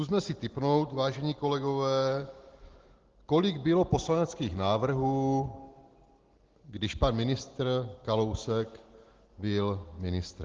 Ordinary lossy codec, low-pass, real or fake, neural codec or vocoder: Opus, 16 kbps; 7.2 kHz; real; none